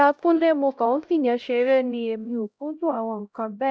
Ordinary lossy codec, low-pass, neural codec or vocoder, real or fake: none; none; codec, 16 kHz, 0.5 kbps, X-Codec, HuBERT features, trained on LibriSpeech; fake